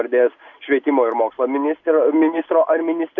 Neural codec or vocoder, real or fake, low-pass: none; real; 7.2 kHz